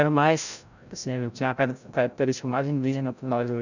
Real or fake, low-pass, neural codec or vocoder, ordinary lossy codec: fake; 7.2 kHz; codec, 16 kHz, 0.5 kbps, FreqCodec, larger model; none